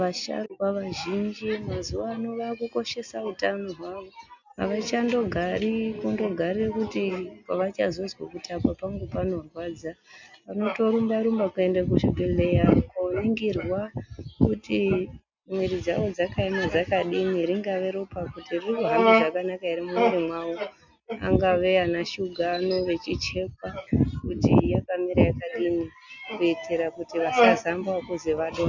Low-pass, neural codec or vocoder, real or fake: 7.2 kHz; none; real